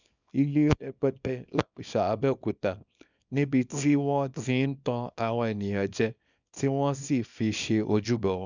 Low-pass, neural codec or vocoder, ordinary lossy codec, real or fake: 7.2 kHz; codec, 24 kHz, 0.9 kbps, WavTokenizer, small release; none; fake